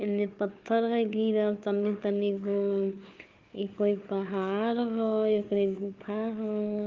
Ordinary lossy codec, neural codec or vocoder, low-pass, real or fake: Opus, 32 kbps; codec, 16 kHz, 4 kbps, FunCodec, trained on Chinese and English, 50 frames a second; 7.2 kHz; fake